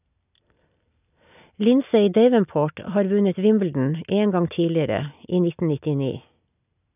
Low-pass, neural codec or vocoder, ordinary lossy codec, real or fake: 3.6 kHz; none; none; real